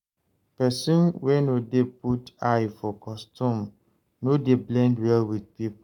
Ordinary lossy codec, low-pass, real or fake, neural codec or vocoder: none; 19.8 kHz; fake; codec, 44.1 kHz, 7.8 kbps, Pupu-Codec